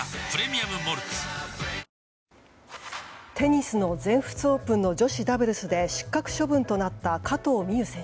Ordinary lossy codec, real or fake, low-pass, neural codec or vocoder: none; real; none; none